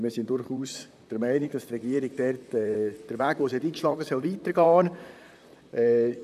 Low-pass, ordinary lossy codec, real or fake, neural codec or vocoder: 14.4 kHz; none; fake; vocoder, 44.1 kHz, 128 mel bands, Pupu-Vocoder